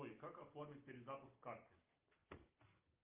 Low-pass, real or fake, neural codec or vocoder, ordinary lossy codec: 3.6 kHz; real; none; Opus, 24 kbps